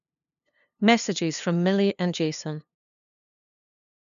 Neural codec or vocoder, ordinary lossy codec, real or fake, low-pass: codec, 16 kHz, 2 kbps, FunCodec, trained on LibriTTS, 25 frames a second; none; fake; 7.2 kHz